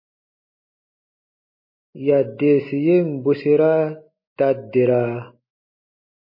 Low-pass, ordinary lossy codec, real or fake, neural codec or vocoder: 5.4 kHz; MP3, 24 kbps; real; none